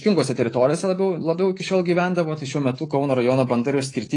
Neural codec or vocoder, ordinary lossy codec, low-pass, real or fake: codec, 24 kHz, 3.1 kbps, DualCodec; AAC, 32 kbps; 10.8 kHz; fake